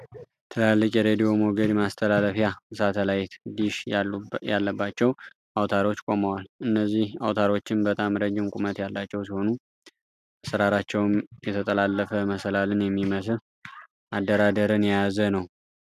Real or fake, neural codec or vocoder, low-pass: real; none; 14.4 kHz